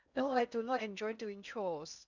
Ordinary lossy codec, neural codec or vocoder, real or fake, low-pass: none; codec, 16 kHz in and 24 kHz out, 0.8 kbps, FocalCodec, streaming, 65536 codes; fake; 7.2 kHz